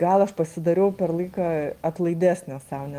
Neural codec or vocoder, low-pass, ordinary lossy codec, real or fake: none; 14.4 kHz; Opus, 24 kbps; real